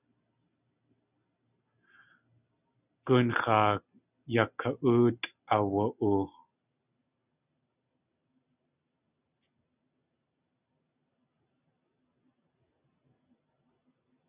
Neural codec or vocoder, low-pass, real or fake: none; 3.6 kHz; real